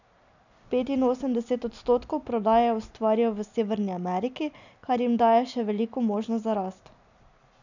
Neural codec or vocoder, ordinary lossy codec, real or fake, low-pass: none; none; real; 7.2 kHz